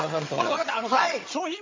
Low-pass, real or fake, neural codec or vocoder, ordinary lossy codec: 7.2 kHz; fake; vocoder, 22.05 kHz, 80 mel bands, HiFi-GAN; MP3, 32 kbps